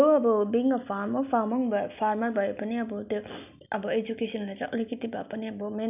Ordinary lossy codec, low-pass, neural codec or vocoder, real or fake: none; 3.6 kHz; none; real